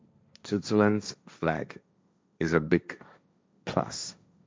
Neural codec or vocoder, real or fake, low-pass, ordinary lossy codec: codec, 16 kHz, 1.1 kbps, Voila-Tokenizer; fake; none; none